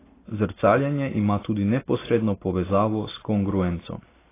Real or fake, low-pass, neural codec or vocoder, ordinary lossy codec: real; 3.6 kHz; none; AAC, 16 kbps